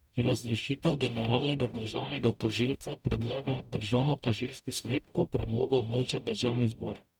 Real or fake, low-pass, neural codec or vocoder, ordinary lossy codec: fake; 19.8 kHz; codec, 44.1 kHz, 0.9 kbps, DAC; none